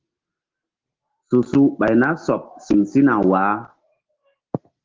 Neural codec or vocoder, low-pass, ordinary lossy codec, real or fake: none; 7.2 kHz; Opus, 16 kbps; real